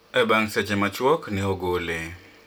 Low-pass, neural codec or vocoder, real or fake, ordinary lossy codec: none; none; real; none